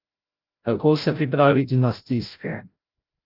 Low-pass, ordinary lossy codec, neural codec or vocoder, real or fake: 5.4 kHz; Opus, 24 kbps; codec, 16 kHz, 0.5 kbps, FreqCodec, larger model; fake